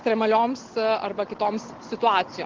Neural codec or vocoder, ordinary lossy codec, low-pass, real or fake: none; Opus, 16 kbps; 7.2 kHz; real